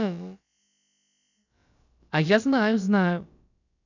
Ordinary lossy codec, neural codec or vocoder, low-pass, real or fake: none; codec, 16 kHz, about 1 kbps, DyCAST, with the encoder's durations; 7.2 kHz; fake